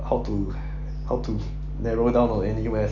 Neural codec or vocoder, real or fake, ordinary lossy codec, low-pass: none; real; none; 7.2 kHz